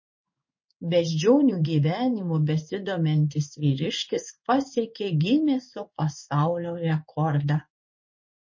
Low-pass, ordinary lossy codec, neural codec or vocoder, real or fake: 7.2 kHz; MP3, 32 kbps; codec, 16 kHz in and 24 kHz out, 1 kbps, XY-Tokenizer; fake